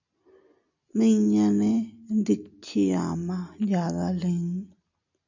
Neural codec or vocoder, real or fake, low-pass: none; real; 7.2 kHz